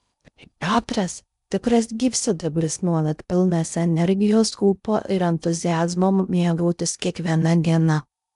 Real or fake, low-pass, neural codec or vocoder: fake; 10.8 kHz; codec, 16 kHz in and 24 kHz out, 0.6 kbps, FocalCodec, streaming, 2048 codes